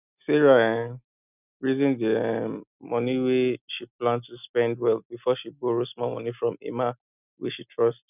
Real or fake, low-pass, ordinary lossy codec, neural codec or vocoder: real; 3.6 kHz; none; none